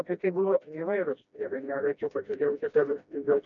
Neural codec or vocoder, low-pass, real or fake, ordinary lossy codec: codec, 16 kHz, 1 kbps, FreqCodec, smaller model; 7.2 kHz; fake; MP3, 96 kbps